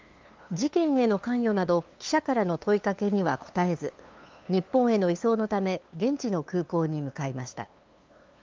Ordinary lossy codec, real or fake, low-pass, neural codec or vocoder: Opus, 32 kbps; fake; 7.2 kHz; codec, 16 kHz, 2 kbps, FunCodec, trained on LibriTTS, 25 frames a second